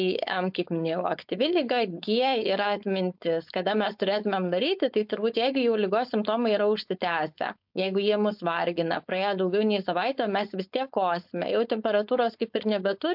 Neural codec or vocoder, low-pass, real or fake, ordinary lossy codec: codec, 16 kHz, 4.8 kbps, FACodec; 5.4 kHz; fake; MP3, 48 kbps